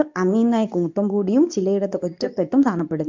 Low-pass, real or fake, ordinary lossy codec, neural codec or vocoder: 7.2 kHz; fake; MP3, 64 kbps; codec, 24 kHz, 0.9 kbps, WavTokenizer, medium speech release version 2